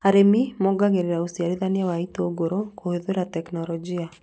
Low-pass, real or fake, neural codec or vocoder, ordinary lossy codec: none; real; none; none